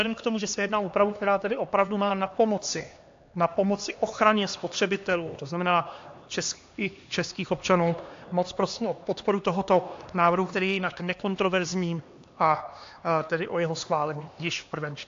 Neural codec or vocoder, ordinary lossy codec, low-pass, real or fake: codec, 16 kHz, 2 kbps, X-Codec, HuBERT features, trained on LibriSpeech; AAC, 48 kbps; 7.2 kHz; fake